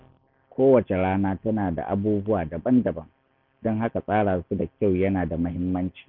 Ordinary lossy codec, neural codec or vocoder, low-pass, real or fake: Opus, 24 kbps; none; 5.4 kHz; real